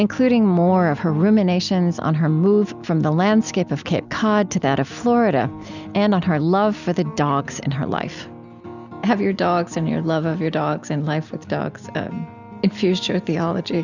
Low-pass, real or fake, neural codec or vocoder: 7.2 kHz; real; none